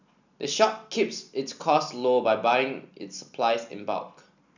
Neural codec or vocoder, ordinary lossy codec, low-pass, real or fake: none; none; 7.2 kHz; real